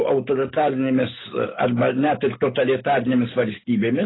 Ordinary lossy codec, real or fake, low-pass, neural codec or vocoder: AAC, 16 kbps; real; 7.2 kHz; none